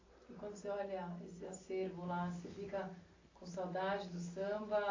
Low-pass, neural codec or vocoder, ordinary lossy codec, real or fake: 7.2 kHz; none; AAC, 32 kbps; real